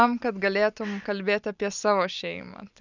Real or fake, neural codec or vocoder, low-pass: real; none; 7.2 kHz